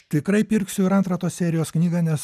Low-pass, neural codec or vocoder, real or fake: 14.4 kHz; none; real